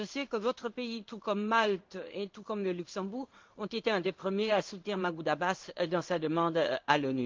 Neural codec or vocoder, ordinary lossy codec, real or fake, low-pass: codec, 16 kHz in and 24 kHz out, 1 kbps, XY-Tokenizer; Opus, 32 kbps; fake; 7.2 kHz